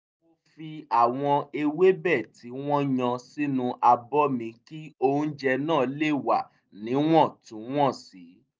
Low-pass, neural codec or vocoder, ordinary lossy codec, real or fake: none; none; none; real